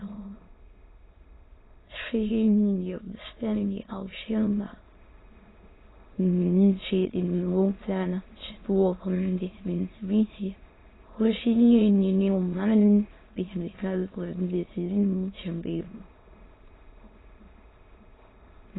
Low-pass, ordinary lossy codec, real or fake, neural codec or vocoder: 7.2 kHz; AAC, 16 kbps; fake; autoencoder, 22.05 kHz, a latent of 192 numbers a frame, VITS, trained on many speakers